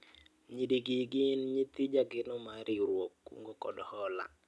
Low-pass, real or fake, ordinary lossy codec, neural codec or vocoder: none; real; none; none